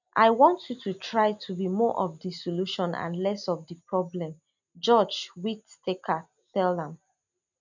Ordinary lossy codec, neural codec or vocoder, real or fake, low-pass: none; none; real; 7.2 kHz